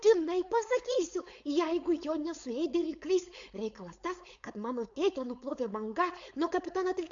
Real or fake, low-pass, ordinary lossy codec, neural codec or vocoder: fake; 7.2 kHz; AAC, 64 kbps; codec, 16 kHz, 4.8 kbps, FACodec